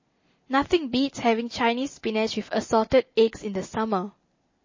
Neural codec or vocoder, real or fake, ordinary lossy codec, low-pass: none; real; MP3, 32 kbps; 7.2 kHz